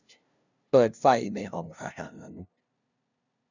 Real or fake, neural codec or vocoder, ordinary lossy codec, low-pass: fake; codec, 16 kHz, 0.5 kbps, FunCodec, trained on LibriTTS, 25 frames a second; none; 7.2 kHz